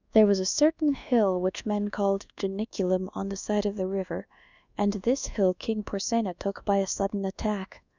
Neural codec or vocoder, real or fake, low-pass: codec, 24 kHz, 1.2 kbps, DualCodec; fake; 7.2 kHz